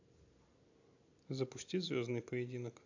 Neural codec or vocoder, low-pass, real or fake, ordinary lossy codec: none; 7.2 kHz; real; AAC, 48 kbps